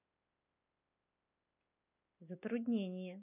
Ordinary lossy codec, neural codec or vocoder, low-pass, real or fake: none; none; 3.6 kHz; real